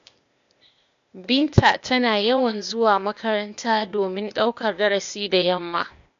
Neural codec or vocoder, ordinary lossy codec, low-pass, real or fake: codec, 16 kHz, 0.8 kbps, ZipCodec; MP3, 64 kbps; 7.2 kHz; fake